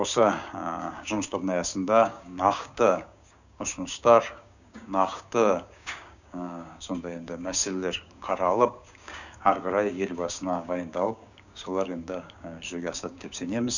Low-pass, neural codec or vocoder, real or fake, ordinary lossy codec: 7.2 kHz; codec, 16 kHz, 6 kbps, DAC; fake; none